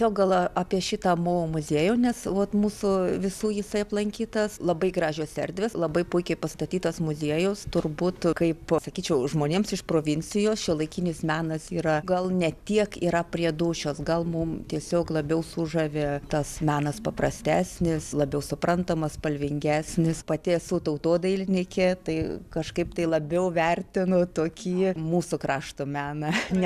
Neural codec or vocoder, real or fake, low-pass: none; real; 14.4 kHz